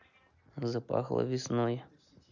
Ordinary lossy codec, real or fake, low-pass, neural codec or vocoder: none; real; 7.2 kHz; none